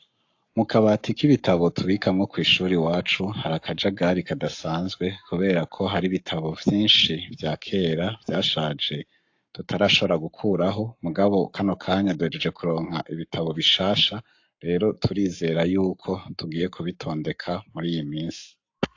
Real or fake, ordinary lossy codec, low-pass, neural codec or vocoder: fake; AAC, 48 kbps; 7.2 kHz; codec, 44.1 kHz, 7.8 kbps, Pupu-Codec